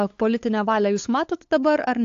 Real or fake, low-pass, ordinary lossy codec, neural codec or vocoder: fake; 7.2 kHz; AAC, 48 kbps; codec, 16 kHz, 8 kbps, FunCodec, trained on LibriTTS, 25 frames a second